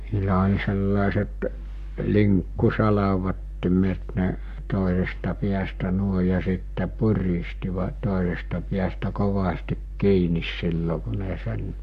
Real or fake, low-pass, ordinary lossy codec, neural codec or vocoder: fake; 14.4 kHz; none; codec, 44.1 kHz, 7.8 kbps, Pupu-Codec